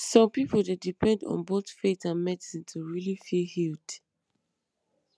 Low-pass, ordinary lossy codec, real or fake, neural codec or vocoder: none; none; real; none